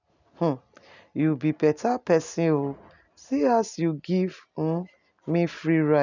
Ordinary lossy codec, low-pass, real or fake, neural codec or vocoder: none; 7.2 kHz; real; none